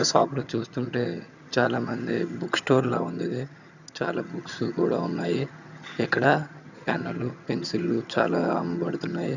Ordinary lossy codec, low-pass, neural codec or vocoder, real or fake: none; 7.2 kHz; vocoder, 22.05 kHz, 80 mel bands, HiFi-GAN; fake